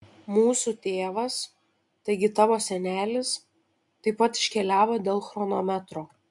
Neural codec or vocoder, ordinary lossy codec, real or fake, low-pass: none; MP3, 64 kbps; real; 10.8 kHz